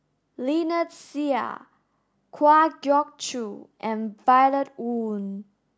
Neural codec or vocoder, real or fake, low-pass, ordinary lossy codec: none; real; none; none